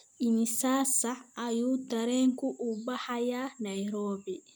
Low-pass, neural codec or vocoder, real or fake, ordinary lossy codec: none; none; real; none